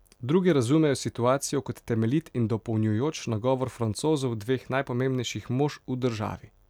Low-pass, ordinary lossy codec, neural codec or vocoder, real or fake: 19.8 kHz; none; none; real